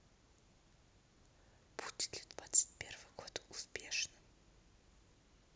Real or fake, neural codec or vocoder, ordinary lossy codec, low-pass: real; none; none; none